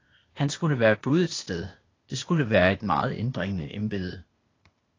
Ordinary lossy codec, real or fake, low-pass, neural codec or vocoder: AAC, 32 kbps; fake; 7.2 kHz; codec, 16 kHz, 0.8 kbps, ZipCodec